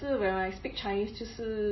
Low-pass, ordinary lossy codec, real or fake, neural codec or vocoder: 7.2 kHz; MP3, 24 kbps; real; none